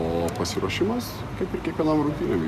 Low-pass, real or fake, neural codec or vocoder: 14.4 kHz; real; none